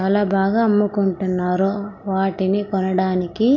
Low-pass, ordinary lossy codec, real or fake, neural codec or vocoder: 7.2 kHz; none; real; none